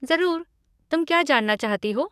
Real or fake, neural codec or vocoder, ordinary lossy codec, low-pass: fake; codec, 44.1 kHz, 7.8 kbps, DAC; none; 14.4 kHz